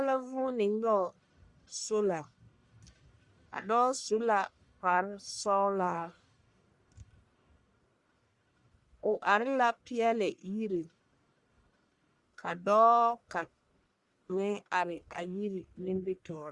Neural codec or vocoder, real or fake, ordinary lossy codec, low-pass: codec, 44.1 kHz, 1.7 kbps, Pupu-Codec; fake; Opus, 64 kbps; 10.8 kHz